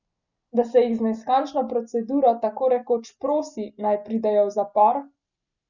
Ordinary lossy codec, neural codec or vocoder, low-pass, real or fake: none; none; 7.2 kHz; real